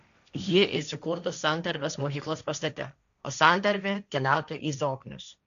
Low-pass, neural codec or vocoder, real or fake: 7.2 kHz; codec, 16 kHz, 1.1 kbps, Voila-Tokenizer; fake